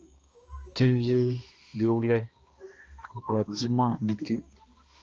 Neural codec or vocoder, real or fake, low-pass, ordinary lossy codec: codec, 16 kHz, 1 kbps, X-Codec, HuBERT features, trained on balanced general audio; fake; 7.2 kHz; Opus, 24 kbps